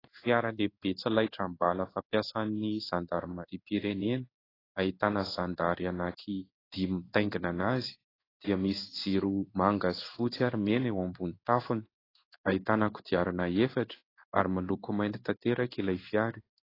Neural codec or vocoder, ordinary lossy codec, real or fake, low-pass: codec, 16 kHz in and 24 kHz out, 1 kbps, XY-Tokenizer; AAC, 24 kbps; fake; 5.4 kHz